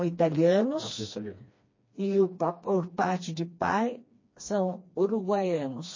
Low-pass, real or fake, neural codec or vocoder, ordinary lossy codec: 7.2 kHz; fake; codec, 16 kHz, 2 kbps, FreqCodec, smaller model; MP3, 32 kbps